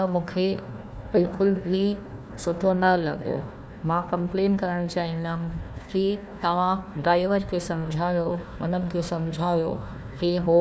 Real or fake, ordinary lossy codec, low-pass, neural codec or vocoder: fake; none; none; codec, 16 kHz, 1 kbps, FunCodec, trained on Chinese and English, 50 frames a second